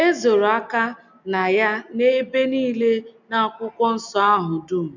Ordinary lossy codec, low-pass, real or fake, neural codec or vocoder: none; 7.2 kHz; real; none